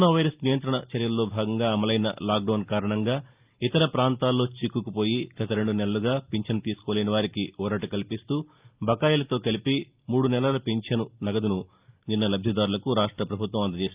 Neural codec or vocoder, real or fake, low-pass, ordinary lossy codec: none; real; 3.6 kHz; Opus, 24 kbps